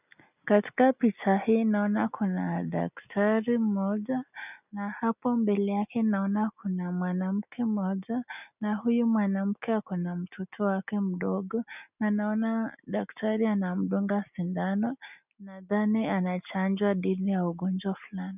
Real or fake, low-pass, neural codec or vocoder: real; 3.6 kHz; none